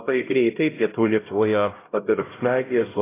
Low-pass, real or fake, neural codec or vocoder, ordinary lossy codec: 3.6 kHz; fake; codec, 16 kHz, 0.5 kbps, X-Codec, HuBERT features, trained on LibriSpeech; AAC, 16 kbps